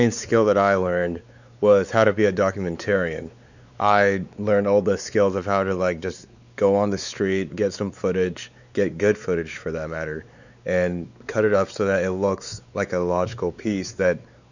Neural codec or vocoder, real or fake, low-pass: codec, 16 kHz, 4 kbps, X-Codec, WavLM features, trained on Multilingual LibriSpeech; fake; 7.2 kHz